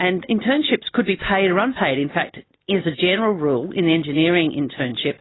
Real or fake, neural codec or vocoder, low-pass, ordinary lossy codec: real; none; 7.2 kHz; AAC, 16 kbps